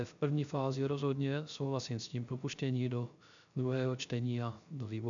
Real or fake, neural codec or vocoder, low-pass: fake; codec, 16 kHz, 0.3 kbps, FocalCodec; 7.2 kHz